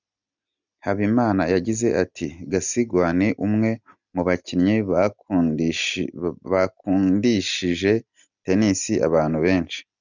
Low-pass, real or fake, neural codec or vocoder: 7.2 kHz; real; none